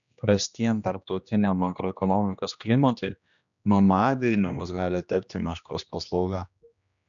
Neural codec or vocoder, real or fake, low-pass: codec, 16 kHz, 1 kbps, X-Codec, HuBERT features, trained on general audio; fake; 7.2 kHz